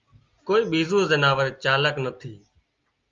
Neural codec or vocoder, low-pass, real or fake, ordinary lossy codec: none; 7.2 kHz; real; Opus, 32 kbps